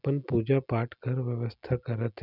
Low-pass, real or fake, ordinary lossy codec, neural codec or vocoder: 5.4 kHz; real; none; none